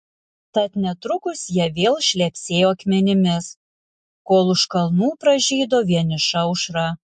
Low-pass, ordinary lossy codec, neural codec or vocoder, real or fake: 10.8 kHz; MP3, 48 kbps; none; real